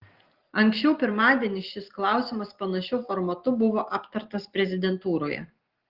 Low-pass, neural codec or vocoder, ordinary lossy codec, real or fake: 5.4 kHz; none; Opus, 16 kbps; real